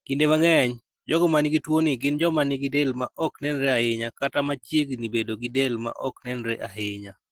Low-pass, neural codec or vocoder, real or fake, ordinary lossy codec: 19.8 kHz; none; real; Opus, 16 kbps